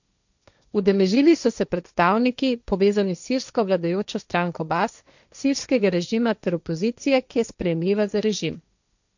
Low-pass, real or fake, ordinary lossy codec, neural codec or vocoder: 7.2 kHz; fake; none; codec, 16 kHz, 1.1 kbps, Voila-Tokenizer